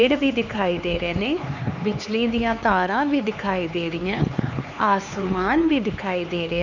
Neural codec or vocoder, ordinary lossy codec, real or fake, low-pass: codec, 16 kHz, 4 kbps, X-Codec, HuBERT features, trained on LibriSpeech; none; fake; 7.2 kHz